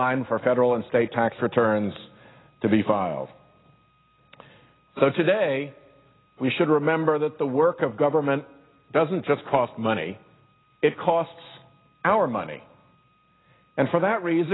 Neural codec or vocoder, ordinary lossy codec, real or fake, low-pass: none; AAC, 16 kbps; real; 7.2 kHz